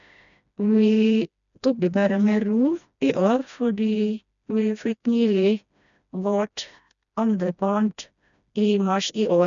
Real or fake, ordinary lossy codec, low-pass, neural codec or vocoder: fake; none; 7.2 kHz; codec, 16 kHz, 1 kbps, FreqCodec, smaller model